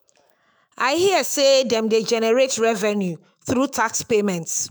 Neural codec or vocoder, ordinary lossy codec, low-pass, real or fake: autoencoder, 48 kHz, 128 numbers a frame, DAC-VAE, trained on Japanese speech; none; none; fake